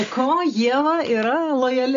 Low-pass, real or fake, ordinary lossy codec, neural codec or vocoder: 7.2 kHz; real; AAC, 64 kbps; none